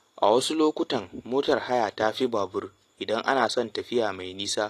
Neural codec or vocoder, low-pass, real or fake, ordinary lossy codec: none; 14.4 kHz; real; AAC, 48 kbps